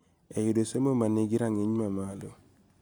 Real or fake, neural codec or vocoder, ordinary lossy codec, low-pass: real; none; none; none